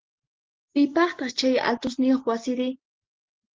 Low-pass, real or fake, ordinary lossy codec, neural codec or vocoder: 7.2 kHz; real; Opus, 16 kbps; none